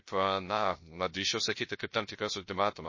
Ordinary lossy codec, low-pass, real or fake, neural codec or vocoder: MP3, 32 kbps; 7.2 kHz; fake; codec, 16 kHz, 0.3 kbps, FocalCodec